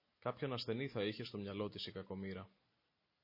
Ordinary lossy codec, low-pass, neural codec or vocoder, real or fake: MP3, 24 kbps; 5.4 kHz; none; real